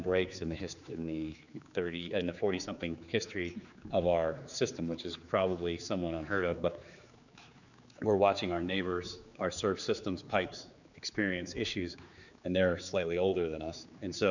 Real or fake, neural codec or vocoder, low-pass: fake; codec, 16 kHz, 4 kbps, X-Codec, HuBERT features, trained on general audio; 7.2 kHz